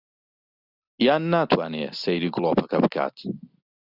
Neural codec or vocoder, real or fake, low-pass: none; real; 5.4 kHz